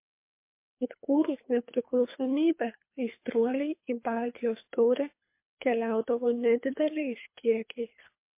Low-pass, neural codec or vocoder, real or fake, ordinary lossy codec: 3.6 kHz; codec, 24 kHz, 3 kbps, HILCodec; fake; MP3, 24 kbps